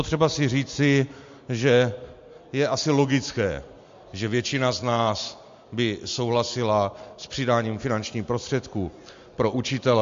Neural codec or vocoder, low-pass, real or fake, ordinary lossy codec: none; 7.2 kHz; real; MP3, 48 kbps